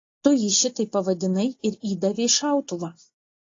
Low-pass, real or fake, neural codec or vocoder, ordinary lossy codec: 7.2 kHz; real; none; AAC, 32 kbps